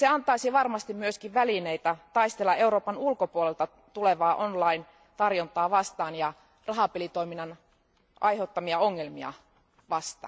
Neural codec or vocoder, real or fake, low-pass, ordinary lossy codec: none; real; none; none